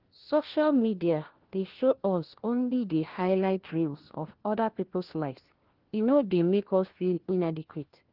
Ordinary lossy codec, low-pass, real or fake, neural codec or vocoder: Opus, 16 kbps; 5.4 kHz; fake; codec, 16 kHz, 1 kbps, FunCodec, trained on LibriTTS, 50 frames a second